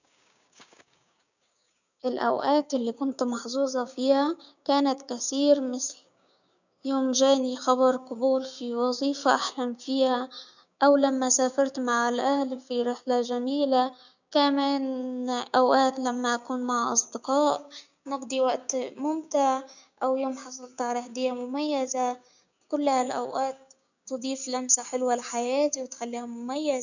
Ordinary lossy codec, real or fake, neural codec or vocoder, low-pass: none; fake; codec, 16 kHz, 6 kbps, DAC; 7.2 kHz